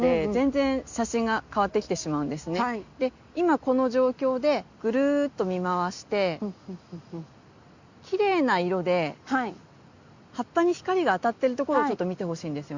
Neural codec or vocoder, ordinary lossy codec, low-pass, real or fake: none; Opus, 64 kbps; 7.2 kHz; real